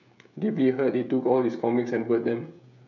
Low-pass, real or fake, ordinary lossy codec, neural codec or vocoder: 7.2 kHz; fake; none; codec, 16 kHz, 16 kbps, FreqCodec, smaller model